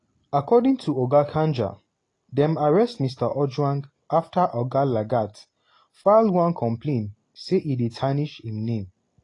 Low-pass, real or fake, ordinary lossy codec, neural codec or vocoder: 10.8 kHz; real; AAC, 32 kbps; none